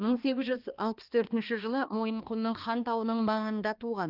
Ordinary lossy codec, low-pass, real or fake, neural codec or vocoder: Opus, 32 kbps; 5.4 kHz; fake; codec, 16 kHz, 2 kbps, X-Codec, HuBERT features, trained on balanced general audio